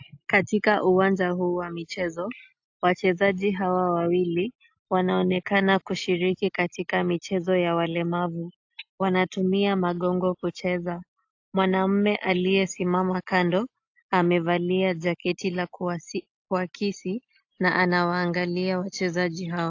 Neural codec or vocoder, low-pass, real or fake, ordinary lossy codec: none; 7.2 kHz; real; AAC, 48 kbps